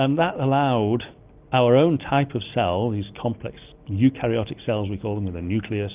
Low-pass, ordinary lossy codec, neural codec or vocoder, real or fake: 3.6 kHz; Opus, 24 kbps; none; real